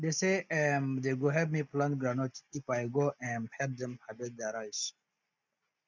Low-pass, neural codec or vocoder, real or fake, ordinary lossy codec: 7.2 kHz; none; real; none